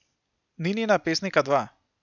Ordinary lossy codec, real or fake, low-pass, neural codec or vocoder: none; real; 7.2 kHz; none